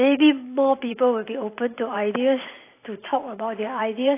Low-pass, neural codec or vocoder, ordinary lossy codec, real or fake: 3.6 kHz; vocoder, 44.1 kHz, 128 mel bands every 512 samples, BigVGAN v2; AAC, 24 kbps; fake